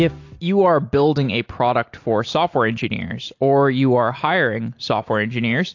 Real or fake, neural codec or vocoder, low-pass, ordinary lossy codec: real; none; 7.2 kHz; AAC, 48 kbps